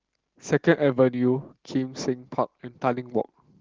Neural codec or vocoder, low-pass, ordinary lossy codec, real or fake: none; 7.2 kHz; Opus, 16 kbps; real